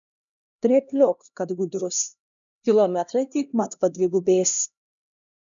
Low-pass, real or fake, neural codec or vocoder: 7.2 kHz; fake; codec, 16 kHz, 1 kbps, X-Codec, HuBERT features, trained on LibriSpeech